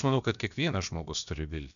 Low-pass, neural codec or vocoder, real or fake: 7.2 kHz; codec, 16 kHz, about 1 kbps, DyCAST, with the encoder's durations; fake